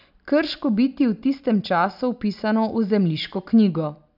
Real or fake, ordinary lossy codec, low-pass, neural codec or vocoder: real; AAC, 48 kbps; 5.4 kHz; none